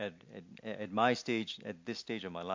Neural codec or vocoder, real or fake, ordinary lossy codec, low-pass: none; real; MP3, 48 kbps; 7.2 kHz